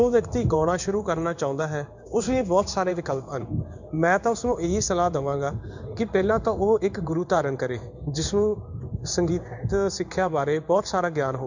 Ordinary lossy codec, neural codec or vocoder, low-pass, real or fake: none; codec, 16 kHz in and 24 kHz out, 1 kbps, XY-Tokenizer; 7.2 kHz; fake